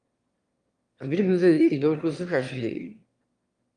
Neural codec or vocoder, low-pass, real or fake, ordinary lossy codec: autoencoder, 22.05 kHz, a latent of 192 numbers a frame, VITS, trained on one speaker; 9.9 kHz; fake; Opus, 32 kbps